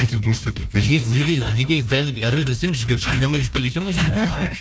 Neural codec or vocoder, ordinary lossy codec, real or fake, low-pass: codec, 16 kHz, 1 kbps, FunCodec, trained on Chinese and English, 50 frames a second; none; fake; none